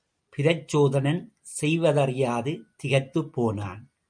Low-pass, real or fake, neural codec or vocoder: 9.9 kHz; real; none